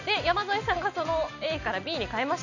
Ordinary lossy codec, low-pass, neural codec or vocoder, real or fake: none; 7.2 kHz; none; real